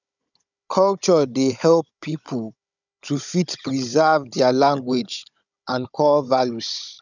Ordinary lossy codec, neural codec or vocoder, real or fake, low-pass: none; codec, 16 kHz, 16 kbps, FunCodec, trained on Chinese and English, 50 frames a second; fake; 7.2 kHz